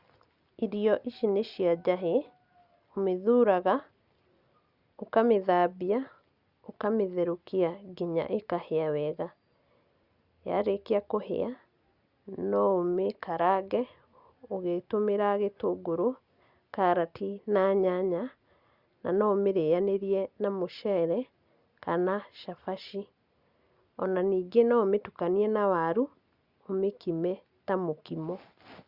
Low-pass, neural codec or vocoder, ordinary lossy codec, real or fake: 5.4 kHz; none; Opus, 64 kbps; real